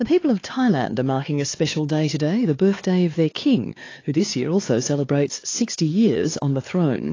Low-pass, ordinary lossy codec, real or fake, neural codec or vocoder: 7.2 kHz; AAC, 32 kbps; fake; codec, 16 kHz, 4 kbps, X-Codec, HuBERT features, trained on LibriSpeech